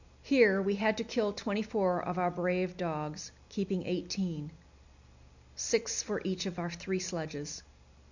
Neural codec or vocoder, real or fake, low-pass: none; real; 7.2 kHz